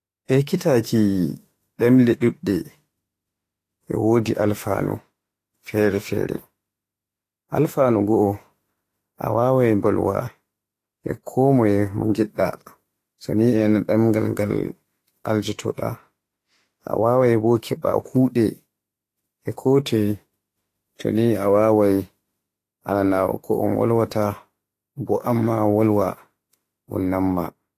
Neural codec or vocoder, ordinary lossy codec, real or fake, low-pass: autoencoder, 48 kHz, 32 numbers a frame, DAC-VAE, trained on Japanese speech; AAC, 48 kbps; fake; 14.4 kHz